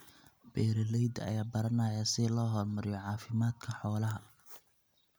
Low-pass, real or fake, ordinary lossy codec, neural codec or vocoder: none; real; none; none